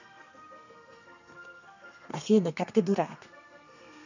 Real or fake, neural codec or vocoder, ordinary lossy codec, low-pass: fake; codec, 32 kHz, 1.9 kbps, SNAC; none; 7.2 kHz